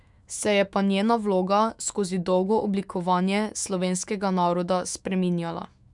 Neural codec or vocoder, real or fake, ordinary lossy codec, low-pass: autoencoder, 48 kHz, 128 numbers a frame, DAC-VAE, trained on Japanese speech; fake; none; 10.8 kHz